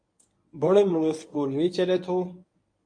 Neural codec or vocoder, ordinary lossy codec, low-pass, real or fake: codec, 24 kHz, 0.9 kbps, WavTokenizer, medium speech release version 1; MP3, 48 kbps; 9.9 kHz; fake